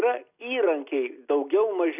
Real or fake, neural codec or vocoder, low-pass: real; none; 3.6 kHz